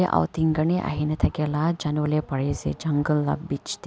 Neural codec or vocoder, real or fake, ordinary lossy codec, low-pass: none; real; none; none